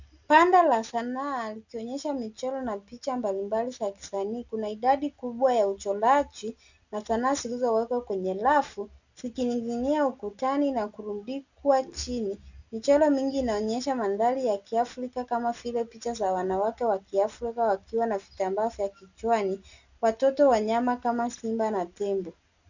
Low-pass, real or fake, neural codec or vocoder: 7.2 kHz; real; none